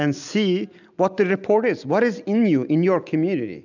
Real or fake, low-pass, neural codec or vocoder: real; 7.2 kHz; none